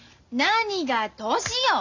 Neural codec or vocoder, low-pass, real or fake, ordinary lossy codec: none; 7.2 kHz; real; none